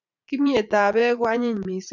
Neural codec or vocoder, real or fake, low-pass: vocoder, 44.1 kHz, 80 mel bands, Vocos; fake; 7.2 kHz